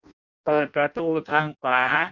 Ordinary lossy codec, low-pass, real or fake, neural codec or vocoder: none; 7.2 kHz; fake; codec, 16 kHz in and 24 kHz out, 0.6 kbps, FireRedTTS-2 codec